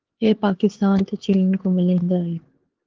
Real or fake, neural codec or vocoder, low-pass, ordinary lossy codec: fake; codec, 16 kHz, 4 kbps, X-Codec, HuBERT features, trained on LibriSpeech; 7.2 kHz; Opus, 16 kbps